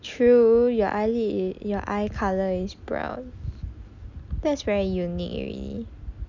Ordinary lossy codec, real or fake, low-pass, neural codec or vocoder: none; real; 7.2 kHz; none